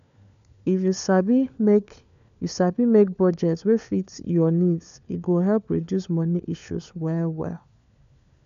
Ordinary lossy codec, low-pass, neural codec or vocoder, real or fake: AAC, 96 kbps; 7.2 kHz; codec, 16 kHz, 4 kbps, FunCodec, trained on LibriTTS, 50 frames a second; fake